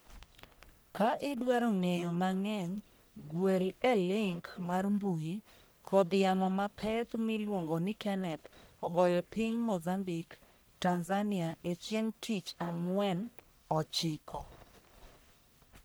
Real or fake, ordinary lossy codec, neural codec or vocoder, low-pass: fake; none; codec, 44.1 kHz, 1.7 kbps, Pupu-Codec; none